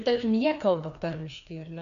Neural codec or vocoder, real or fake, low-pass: codec, 16 kHz, 2 kbps, FreqCodec, larger model; fake; 7.2 kHz